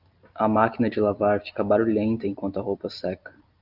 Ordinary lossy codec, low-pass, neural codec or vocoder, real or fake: Opus, 24 kbps; 5.4 kHz; none; real